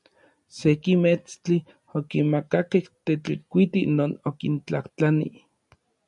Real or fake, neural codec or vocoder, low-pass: real; none; 10.8 kHz